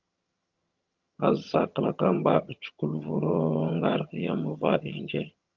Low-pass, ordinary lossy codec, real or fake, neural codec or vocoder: 7.2 kHz; Opus, 24 kbps; fake; vocoder, 22.05 kHz, 80 mel bands, HiFi-GAN